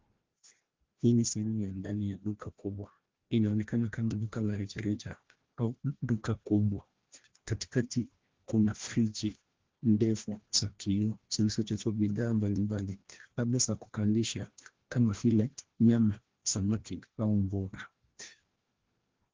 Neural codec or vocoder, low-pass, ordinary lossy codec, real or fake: codec, 16 kHz, 1 kbps, FreqCodec, larger model; 7.2 kHz; Opus, 16 kbps; fake